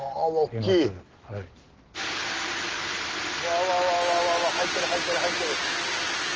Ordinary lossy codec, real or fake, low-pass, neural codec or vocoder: Opus, 16 kbps; real; 7.2 kHz; none